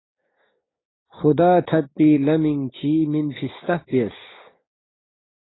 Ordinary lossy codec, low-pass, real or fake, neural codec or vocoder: AAC, 16 kbps; 7.2 kHz; fake; codec, 16 kHz, 6 kbps, DAC